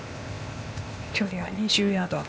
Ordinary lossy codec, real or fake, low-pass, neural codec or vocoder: none; fake; none; codec, 16 kHz, 0.8 kbps, ZipCodec